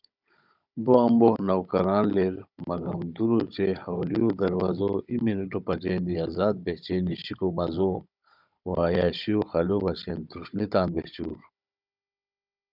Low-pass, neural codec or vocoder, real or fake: 5.4 kHz; codec, 16 kHz, 16 kbps, FunCodec, trained on Chinese and English, 50 frames a second; fake